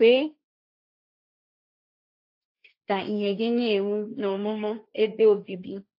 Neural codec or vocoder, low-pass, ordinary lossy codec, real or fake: codec, 16 kHz, 1.1 kbps, Voila-Tokenizer; 5.4 kHz; AAC, 32 kbps; fake